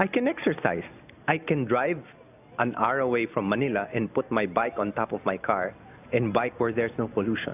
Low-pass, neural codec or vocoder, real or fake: 3.6 kHz; none; real